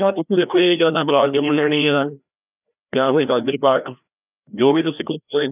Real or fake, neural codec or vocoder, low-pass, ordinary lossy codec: fake; codec, 16 kHz, 1 kbps, FreqCodec, larger model; 3.6 kHz; none